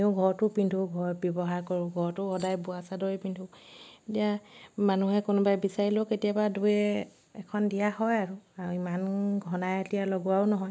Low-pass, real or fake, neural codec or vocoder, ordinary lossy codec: none; real; none; none